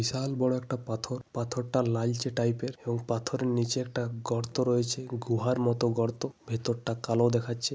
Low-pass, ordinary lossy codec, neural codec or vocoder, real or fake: none; none; none; real